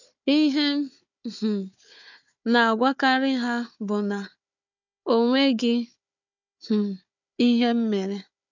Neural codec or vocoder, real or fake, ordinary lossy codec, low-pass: codec, 16 kHz, 4 kbps, FunCodec, trained on Chinese and English, 50 frames a second; fake; none; 7.2 kHz